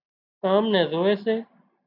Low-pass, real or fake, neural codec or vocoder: 5.4 kHz; real; none